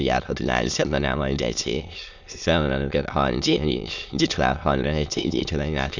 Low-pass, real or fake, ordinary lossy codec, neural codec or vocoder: 7.2 kHz; fake; none; autoencoder, 22.05 kHz, a latent of 192 numbers a frame, VITS, trained on many speakers